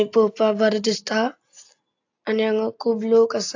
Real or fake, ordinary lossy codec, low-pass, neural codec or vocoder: real; AAC, 48 kbps; 7.2 kHz; none